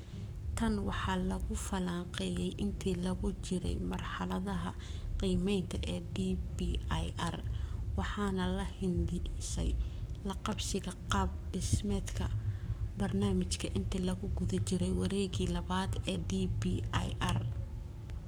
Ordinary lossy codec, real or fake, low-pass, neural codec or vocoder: none; fake; none; codec, 44.1 kHz, 7.8 kbps, Pupu-Codec